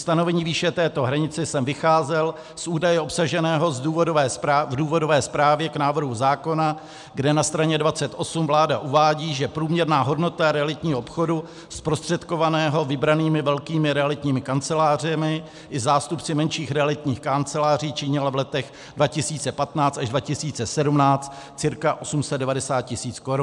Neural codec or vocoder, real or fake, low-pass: none; real; 10.8 kHz